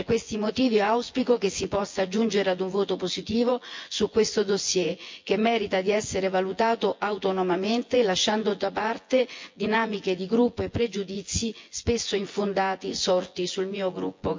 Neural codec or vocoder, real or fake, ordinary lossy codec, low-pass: vocoder, 24 kHz, 100 mel bands, Vocos; fake; MP3, 48 kbps; 7.2 kHz